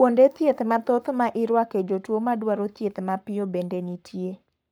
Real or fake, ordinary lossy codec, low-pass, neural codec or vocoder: fake; none; none; codec, 44.1 kHz, 7.8 kbps, Pupu-Codec